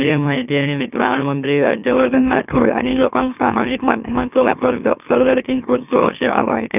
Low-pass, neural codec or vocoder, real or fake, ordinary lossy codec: 3.6 kHz; autoencoder, 44.1 kHz, a latent of 192 numbers a frame, MeloTTS; fake; none